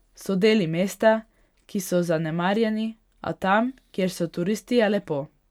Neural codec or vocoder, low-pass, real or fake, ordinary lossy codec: none; 19.8 kHz; real; none